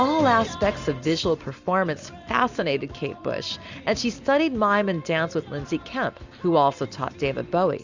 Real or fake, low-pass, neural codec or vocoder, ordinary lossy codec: real; 7.2 kHz; none; Opus, 64 kbps